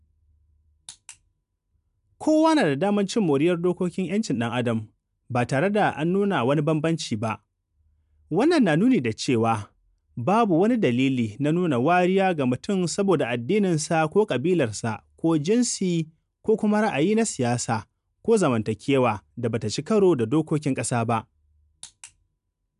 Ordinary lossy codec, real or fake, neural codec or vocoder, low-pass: none; real; none; 10.8 kHz